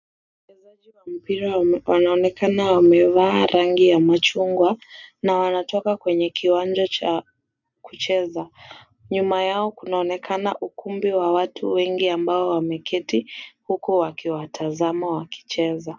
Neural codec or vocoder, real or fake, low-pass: none; real; 7.2 kHz